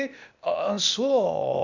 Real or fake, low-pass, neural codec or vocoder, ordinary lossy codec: fake; 7.2 kHz; codec, 16 kHz, 0.8 kbps, ZipCodec; Opus, 64 kbps